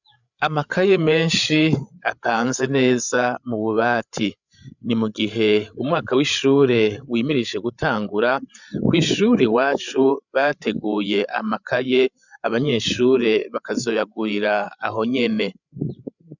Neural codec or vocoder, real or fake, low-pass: codec, 16 kHz, 8 kbps, FreqCodec, larger model; fake; 7.2 kHz